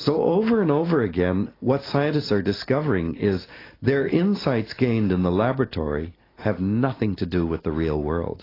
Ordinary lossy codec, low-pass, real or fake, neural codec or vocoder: AAC, 24 kbps; 5.4 kHz; real; none